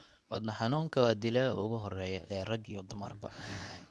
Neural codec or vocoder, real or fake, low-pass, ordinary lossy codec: codec, 24 kHz, 0.9 kbps, WavTokenizer, medium speech release version 2; fake; none; none